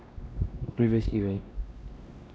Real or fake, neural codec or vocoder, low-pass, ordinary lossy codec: fake; codec, 16 kHz, 1 kbps, X-Codec, WavLM features, trained on Multilingual LibriSpeech; none; none